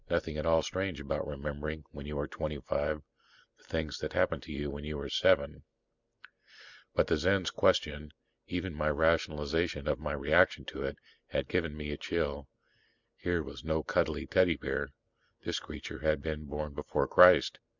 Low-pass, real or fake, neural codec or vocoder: 7.2 kHz; real; none